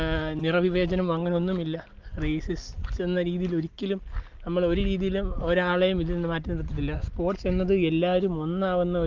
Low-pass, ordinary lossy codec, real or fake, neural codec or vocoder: 7.2 kHz; Opus, 24 kbps; real; none